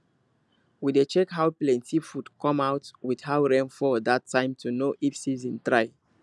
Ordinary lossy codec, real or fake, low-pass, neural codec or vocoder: none; real; none; none